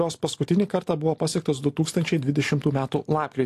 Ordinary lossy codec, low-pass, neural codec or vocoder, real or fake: AAC, 64 kbps; 14.4 kHz; none; real